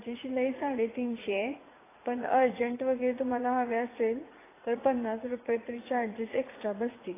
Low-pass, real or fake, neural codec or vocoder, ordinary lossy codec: 3.6 kHz; fake; vocoder, 44.1 kHz, 80 mel bands, Vocos; AAC, 16 kbps